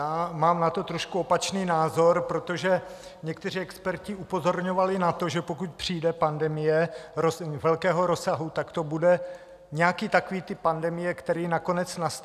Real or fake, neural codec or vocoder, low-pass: real; none; 14.4 kHz